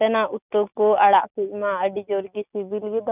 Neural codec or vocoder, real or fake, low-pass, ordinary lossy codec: none; real; 3.6 kHz; none